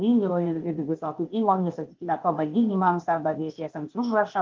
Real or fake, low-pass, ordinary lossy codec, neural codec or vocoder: fake; 7.2 kHz; Opus, 32 kbps; codec, 16 kHz, 0.8 kbps, ZipCodec